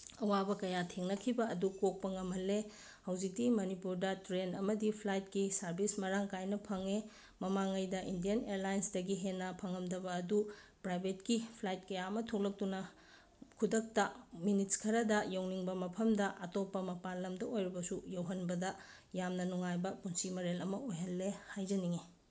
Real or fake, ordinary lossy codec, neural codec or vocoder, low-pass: real; none; none; none